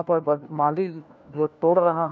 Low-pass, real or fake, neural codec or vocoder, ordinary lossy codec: none; fake; codec, 16 kHz, 1 kbps, FunCodec, trained on LibriTTS, 50 frames a second; none